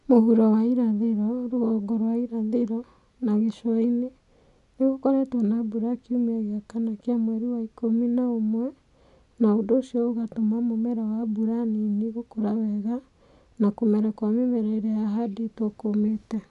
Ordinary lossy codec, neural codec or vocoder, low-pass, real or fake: none; none; 10.8 kHz; real